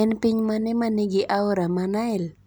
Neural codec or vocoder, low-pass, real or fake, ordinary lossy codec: none; none; real; none